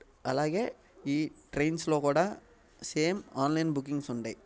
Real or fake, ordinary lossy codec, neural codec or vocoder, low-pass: real; none; none; none